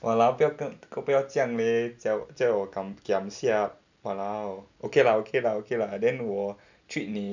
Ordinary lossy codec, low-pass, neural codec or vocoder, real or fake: none; 7.2 kHz; none; real